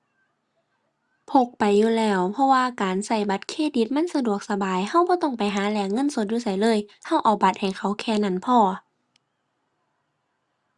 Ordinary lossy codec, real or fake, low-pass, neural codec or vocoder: Opus, 64 kbps; real; 10.8 kHz; none